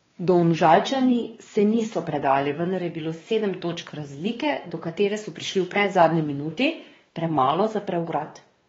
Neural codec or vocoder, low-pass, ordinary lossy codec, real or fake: codec, 16 kHz, 2 kbps, X-Codec, WavLM features, trained on Multilingual LibriSpeech; 7.2 kHz; AAC, 24 kbps; fake